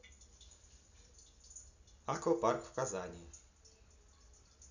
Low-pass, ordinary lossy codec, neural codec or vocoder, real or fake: 7.2 kHz; none; none; real